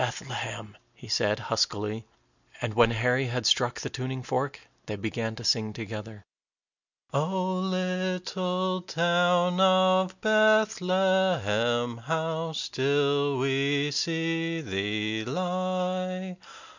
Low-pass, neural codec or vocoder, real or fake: 7.2 kHz; none; real